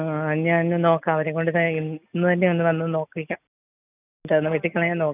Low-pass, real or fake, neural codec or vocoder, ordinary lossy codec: 3.6 kHz; real; none; none